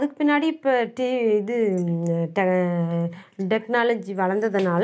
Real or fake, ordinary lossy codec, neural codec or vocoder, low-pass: real; none; none; none